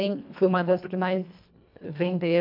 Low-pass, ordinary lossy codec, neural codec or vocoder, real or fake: 5.4 kHz; MP3, 48 kbps; codec, 24 kHz, 1.5 kbps, HILCodec; fake